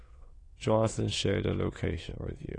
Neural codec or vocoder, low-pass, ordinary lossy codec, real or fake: autoencoder, 22.05 kHz, a latent of 192 numbers a frame, VITS, trained on many speakers; 9.9 kHz; AAC, 32 kbps; fake